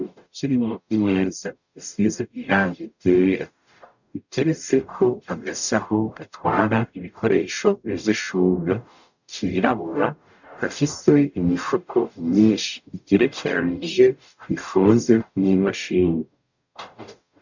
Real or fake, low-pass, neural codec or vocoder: fake; 7.2 kHz; codec, 44.1 kHz, 0.9 kbps, DAC